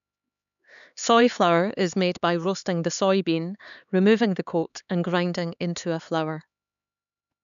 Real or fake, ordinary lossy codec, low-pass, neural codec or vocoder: fake; none; 7.2 kHz; codec, 16 kHz, 4 kbps, X-Codec, HuBERT features, trained on LibriSpeech